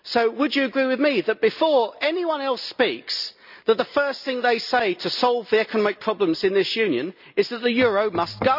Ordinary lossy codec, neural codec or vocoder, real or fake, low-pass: none; none; real; 5.4 kHz